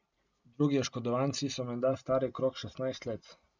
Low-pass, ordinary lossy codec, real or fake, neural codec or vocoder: 7.2 kHz; none; real; none